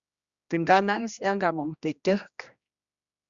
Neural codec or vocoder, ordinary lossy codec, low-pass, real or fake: codec, 16 kHz, 1 kbps, X-Codec, HuBERT features, trained on general audio; Opus, 64 kbps; 7.2 kHz; fake